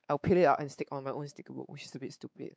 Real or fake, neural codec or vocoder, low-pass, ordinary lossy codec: fake; codec, 16 kHz, 4 kbps, X-Codec, WavLM features, trained on Multilingual LibriSpeech; none; none